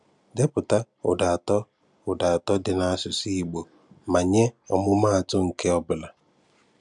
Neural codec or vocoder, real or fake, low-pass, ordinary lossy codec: none; real; 10.8 kHz; none